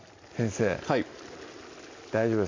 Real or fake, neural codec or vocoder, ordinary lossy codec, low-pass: real; none; MP3, 48 kbps; 7.2 kHz